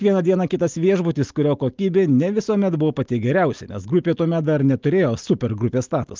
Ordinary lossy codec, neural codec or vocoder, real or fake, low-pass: Opus, 24 kbps; none; real; 7.2 kHz